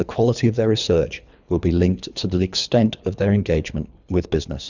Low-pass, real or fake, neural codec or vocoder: 7.2 kHz; fake; codec, 24 kHz, 3 kbps, HILCodec